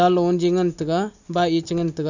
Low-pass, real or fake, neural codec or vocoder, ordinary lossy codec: 7.2 kHz; real; none; none